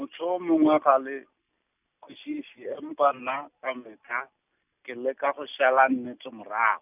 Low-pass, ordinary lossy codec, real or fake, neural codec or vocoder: 3.6 kHz; none; real; none